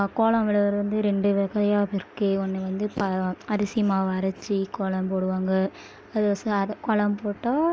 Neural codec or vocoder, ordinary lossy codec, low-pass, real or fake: none; none; none; real